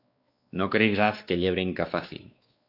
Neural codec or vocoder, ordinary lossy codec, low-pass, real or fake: codec, 16 kHz, 2 kbps, X-Codec, WavLM features, trained on Multilingual LibriSpeech; AAC, 48 kbps; 5.4 kHz; fake